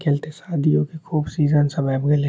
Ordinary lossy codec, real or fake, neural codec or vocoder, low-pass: none; real; none; none